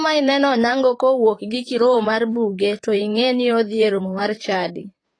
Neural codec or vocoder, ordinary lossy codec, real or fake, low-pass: vocoder, 44.1 kHz, 128 mel bands, Pupu-Vocoder; AAC, 32 kbps; fake; 9.9 kHz